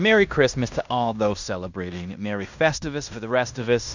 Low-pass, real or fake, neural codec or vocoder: 7.2 kHz; fake; codec, 16 kHz in and 24 kHz out, 0.9 kbps, LongCat-Audio-Codec, fine tuned four codebook decoder